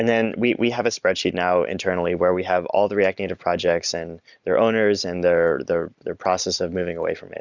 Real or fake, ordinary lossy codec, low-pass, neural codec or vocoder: real; Opus, 64 kbps; 7.2 kHz; none